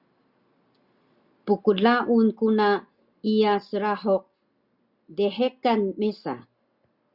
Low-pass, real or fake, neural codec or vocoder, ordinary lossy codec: 5.4 kHz; real; none; Opus, 64 kbps